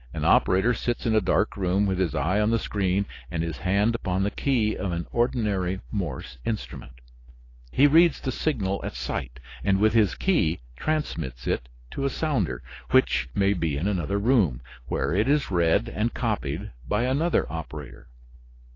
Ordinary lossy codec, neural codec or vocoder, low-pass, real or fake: AAC, 32 kbps; none; 7.2 kHz; real